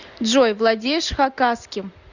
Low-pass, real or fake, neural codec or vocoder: 7.2 kHz; real; none